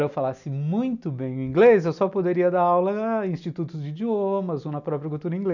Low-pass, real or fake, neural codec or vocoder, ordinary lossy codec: 7.2 kHz; real; none; none